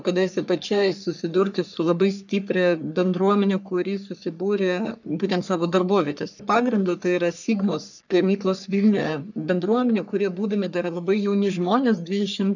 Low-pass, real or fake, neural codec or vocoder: 7.2 kHz; fake; codec, 44.1 kHz, 3.4 kbps, Pupu-Codec